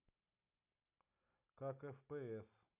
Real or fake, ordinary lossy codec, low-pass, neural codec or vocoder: real; none; 3.6 kHz; none